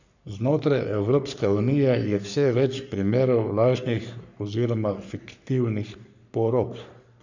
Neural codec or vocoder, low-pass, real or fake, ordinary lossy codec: codec, 44.1 kHz, 3.4 kbps, Pupu-Codec; 7.2 kHz; fake; none